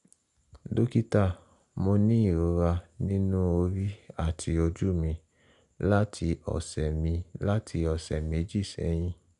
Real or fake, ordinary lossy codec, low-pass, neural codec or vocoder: real; none; 10.8 kHz; none